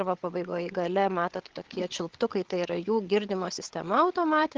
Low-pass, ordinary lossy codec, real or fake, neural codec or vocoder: 7.2 kHz; Opus, 16 kbps; fake; codec, 16 kHz, 8 kbps, FreqCodec, larger model